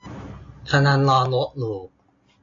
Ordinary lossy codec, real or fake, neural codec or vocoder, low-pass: AAC, 48 kbps; real; none; 7.2 kHz